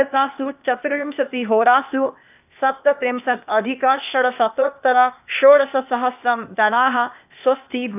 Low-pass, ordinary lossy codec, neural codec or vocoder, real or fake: 3.6 kHz; none; codec, 16 kHz, 0.8 kbps, ZipCodec; fake